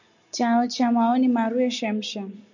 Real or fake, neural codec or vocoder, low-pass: real; none; 7.2 kHz